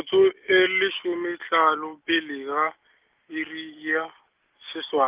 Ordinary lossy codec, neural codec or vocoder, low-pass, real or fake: Opus, 24 kbps; none; 3.6 kHz; real